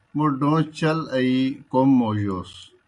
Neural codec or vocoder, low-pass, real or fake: none; 10.8 kHz; real